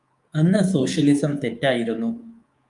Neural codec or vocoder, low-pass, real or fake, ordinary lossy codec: codec, 24 kHz, 3.1 kbps, DualCodec; 10.8 kHz; fake; Opus, 32 kbps